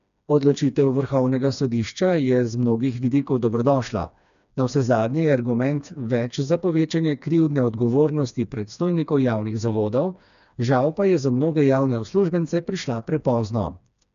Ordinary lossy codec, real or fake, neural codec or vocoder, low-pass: none; fake; codec, 16 kHz, 2 kbps, FreqCodec, smaller model; 7.2 kHz